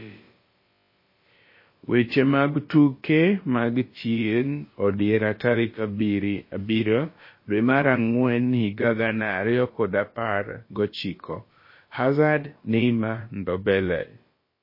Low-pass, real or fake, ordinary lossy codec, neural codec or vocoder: 5.4 kHz; fake; MP3, 24 kbps; codec, 16 kHz, about 1 kbps, DyCAST, with the encoder's durations